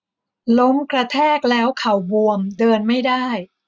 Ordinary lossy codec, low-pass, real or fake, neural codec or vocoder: none; none; real; none